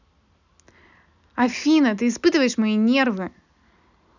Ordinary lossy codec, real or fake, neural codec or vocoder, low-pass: none; real; none; 7.2 kHz